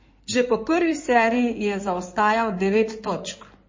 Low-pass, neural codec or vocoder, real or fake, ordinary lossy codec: 7.2 kHz; codec, 16 kHz in and 24 kHz out, 2.2 kbps, FireRedTTS-2 codec; fake; MP3, 32 kbps